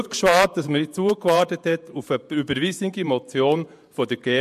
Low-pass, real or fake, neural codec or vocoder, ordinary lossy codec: 14.4 kHz; fake; vocoder, 48 kHz, 128 mel bands, Vocos; MP3, 64 kbps